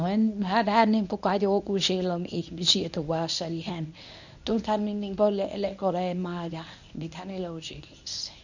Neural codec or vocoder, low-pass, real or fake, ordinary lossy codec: codec, 24 kHz, 0.9 kbps, WavTokenizer, medium speech release version 1; 7.2 kHz; fake; none